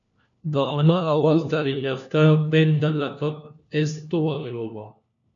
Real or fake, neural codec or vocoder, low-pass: fake; codec, 16 kHz, 1 kbps, FunCodec, trained on LibriTTS, 50 frames a second; 7.2 kHz